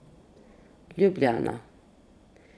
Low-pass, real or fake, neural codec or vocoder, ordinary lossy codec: none; fake; vocoder, 22.05 kHz, 80 mel bands, Vocos; none